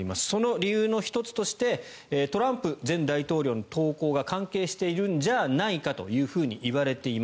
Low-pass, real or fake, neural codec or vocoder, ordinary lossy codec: none; real; none; none